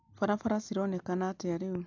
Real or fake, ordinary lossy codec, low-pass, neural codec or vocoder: real; MP3, 64 kbps; 7.2 kHz; none